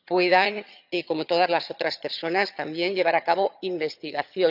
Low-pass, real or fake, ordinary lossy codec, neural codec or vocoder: 5.4 kHz; fake; none; vocoder, 22.05 kHz, 80 mel bands, HiFi-GAN